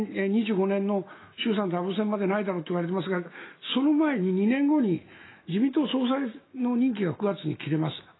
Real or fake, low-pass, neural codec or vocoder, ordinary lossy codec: fake; 7.2 kHz; autoencoder, 48 kHz, 128 numbers a frame, DAC-VAE, trained on Japanese speech; AAC, 16 kbps